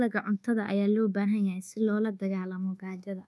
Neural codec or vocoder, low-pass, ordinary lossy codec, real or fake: codec, 24 kHz, 1.2 kbps, DualCodec; none; none; fake